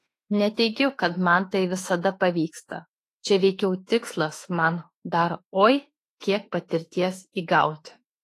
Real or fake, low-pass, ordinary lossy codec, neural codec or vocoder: fake; 14.4 kHz; AAC, 48 kbps; autoencoder, 48 kHz, 32 numbers a frame, DAC-VAE, trained on Japanese speech